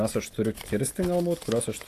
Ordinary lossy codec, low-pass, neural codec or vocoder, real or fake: AAC, 64 kbps; 14.4 kHz; none; real